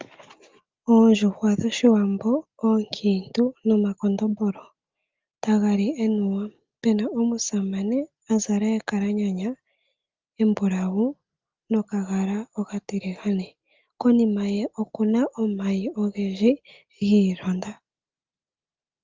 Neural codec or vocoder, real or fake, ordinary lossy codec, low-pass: none; real; Opus, 32 kbps; 7.2 kHz